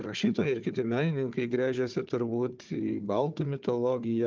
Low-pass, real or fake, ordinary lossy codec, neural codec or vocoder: 7.2 kHz; fake; Opus, 32 kbps; codec, 16 kHz, 4 kbps, FunCodec, trained on Chinese and English, 50 frames a second